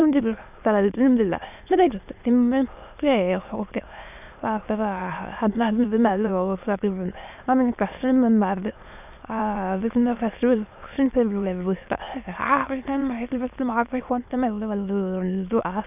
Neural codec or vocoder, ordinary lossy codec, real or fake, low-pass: autoencoder, 22.05 kHz, a latent of 192 numbers a frame, VITS, trained on many speakers; none; fake; 3.6 kHz